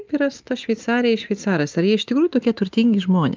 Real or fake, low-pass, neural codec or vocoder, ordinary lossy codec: real; 7.2 kHz; none; Opus, 24 kbps